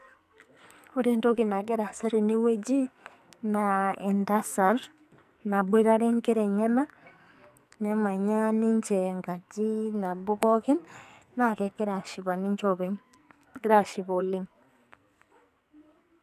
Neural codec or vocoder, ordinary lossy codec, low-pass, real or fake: codec, 44.1 kHz, 2.6 kbps, SNAC; none; 14.4 kHz; fake